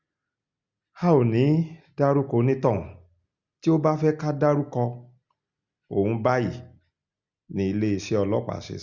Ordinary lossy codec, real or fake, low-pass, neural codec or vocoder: Opus, 64 kbps; real; 7.2 kHz; none